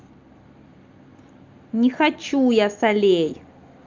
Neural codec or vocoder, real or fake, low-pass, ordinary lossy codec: none; real; 7.2 kHz; Opus, 32 kbps